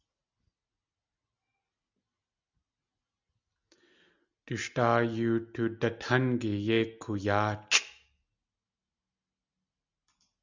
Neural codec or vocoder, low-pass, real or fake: none; 7.2 kHz; real